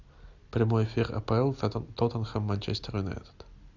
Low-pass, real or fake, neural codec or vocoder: 7.2 kHz; real; none